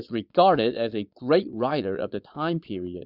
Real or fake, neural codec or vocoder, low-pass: fake; vocoder, 22.05 kHz, 80 mel bands, Vocos; 5.4 kHz